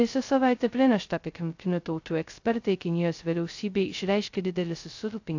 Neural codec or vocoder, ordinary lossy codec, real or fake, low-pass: codec, 16 kHz, 0.2 kbps, FocalCodec; AAC, 48 kbps; fake; 7.2 kHz